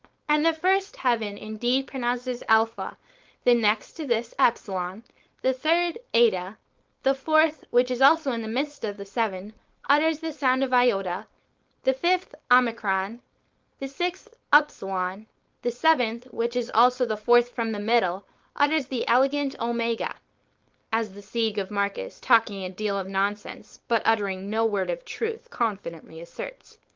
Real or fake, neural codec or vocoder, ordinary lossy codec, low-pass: fake; codec, 16 kHz, 4.8 kbps, FACodec; Opus, 32 kbps; 7.2 kHz